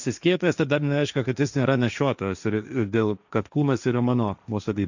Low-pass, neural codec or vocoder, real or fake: 7.2 kHz; codec, 16 kHz, 1.1 kbps, Voila-Tokenizer; fake